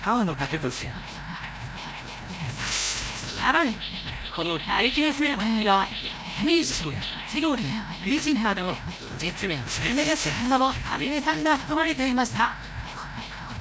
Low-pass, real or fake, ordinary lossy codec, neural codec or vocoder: none; fake; none; codec, 16 kHz, 0.5 kbps, FreqCodec, larger model